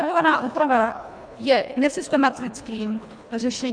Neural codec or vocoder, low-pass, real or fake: codec, 24 kHz, 1.5 kbps, HILCodec; 9.9 kHz; fake